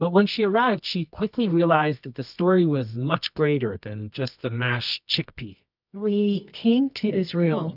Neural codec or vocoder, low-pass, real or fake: codec, 24 kHz, 0.9 kbps, WavTokenizer, medium music audio release; 5.4 kHz; fake